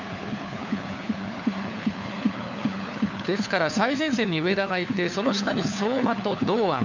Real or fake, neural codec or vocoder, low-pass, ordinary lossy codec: fake; codec, 16 kHz, 4 kbps, FunCodec, trained on LibriTTS, 50 frames a second; 7.2 kHz; none